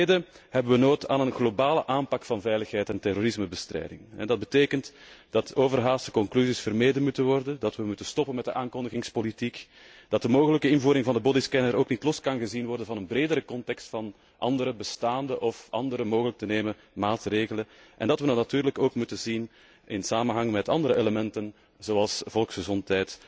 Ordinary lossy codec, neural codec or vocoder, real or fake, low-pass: none; none; real; none